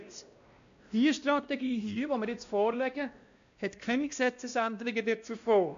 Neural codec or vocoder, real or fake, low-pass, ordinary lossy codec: codec, 16 kHz, 1 kbps, X-Codec, WavLM features, trained on Multilingual LibriSpeech; fake; 7.2 kHz; MP3, 64 kbps